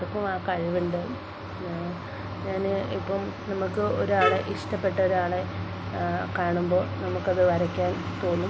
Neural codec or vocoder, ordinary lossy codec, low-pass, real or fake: none; none; none; real